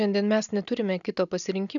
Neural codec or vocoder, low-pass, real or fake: none; 7.2 kHz; real